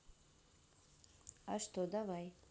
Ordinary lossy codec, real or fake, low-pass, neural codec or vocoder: none; real; none; none